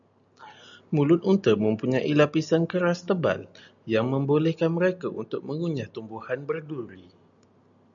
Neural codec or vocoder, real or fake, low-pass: none; real; 7.2 kHz